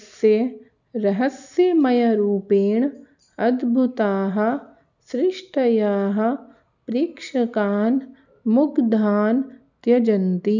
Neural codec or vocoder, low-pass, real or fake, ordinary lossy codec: none; 7.2 kHz; real; none